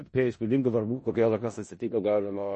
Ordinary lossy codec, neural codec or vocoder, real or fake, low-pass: MP3, 32 kbps; codec, 16 kHz in and 24 kHz out, 0.4 kbps, LongCat-Audio-Codec, four codebook decoder; fake; 10.8 kHz